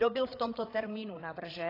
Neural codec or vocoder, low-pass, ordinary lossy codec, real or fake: codec, 16 kHz, 8 kbps, FreqCodec, larger model; 5.4 kHz; AAC, 24 kbps; fake